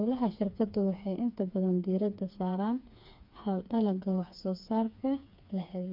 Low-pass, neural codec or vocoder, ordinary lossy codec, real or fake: 5.4 kHz; codec, 16 kHz, 4 kbps, FreqCodec, smaller model; MP3, 48 kbps; fake